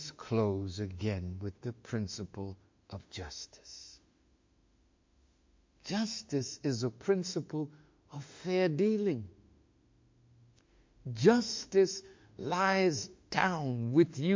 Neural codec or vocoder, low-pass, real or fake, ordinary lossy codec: autoencoder, 48 kHz, 32 numbers a frame, DAC-VAE, trained on Japanese speech; 7.2 kHz; fake; MP3, 48 kbps